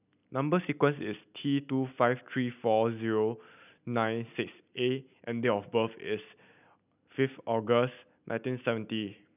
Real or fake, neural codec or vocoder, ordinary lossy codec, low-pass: real; none; none; 3.6 kHz